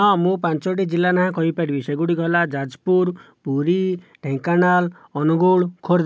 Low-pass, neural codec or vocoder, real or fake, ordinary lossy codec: none; none; real; none